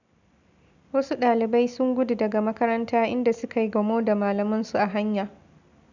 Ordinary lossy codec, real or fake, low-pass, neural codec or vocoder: none; real; 7.2 kHz; none